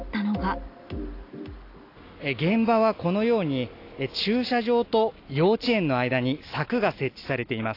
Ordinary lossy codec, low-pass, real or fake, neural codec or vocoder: AAC, 32 kbps; 5.4 kHz; real; none